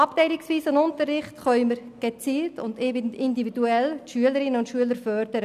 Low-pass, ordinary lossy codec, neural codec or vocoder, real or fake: 14.4 kHz; none; none; real